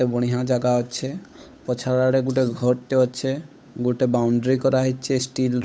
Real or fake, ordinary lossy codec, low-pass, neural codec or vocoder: fake; none; none; codec, 16 kHz, 8 kbps, FunCodec, trained on Chinese and English, 25 frames a second